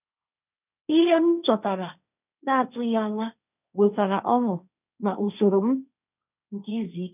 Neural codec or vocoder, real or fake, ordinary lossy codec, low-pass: codec, 16 kHz, 1.1 kbps, Voila-Tokenizer; fake; none; 3.6 kHz